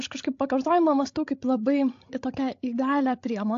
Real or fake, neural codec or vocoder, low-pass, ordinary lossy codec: fake; codec, 16 kHz, 16 kbps, FreqCodec, larger model; 7.2 kHz; AAC, 48 kbps